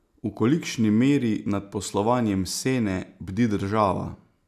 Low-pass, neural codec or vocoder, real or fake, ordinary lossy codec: 14.4 kHz; none; real; none